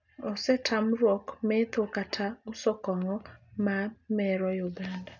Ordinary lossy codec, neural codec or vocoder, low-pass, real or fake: none; none; 7.2 kHz; real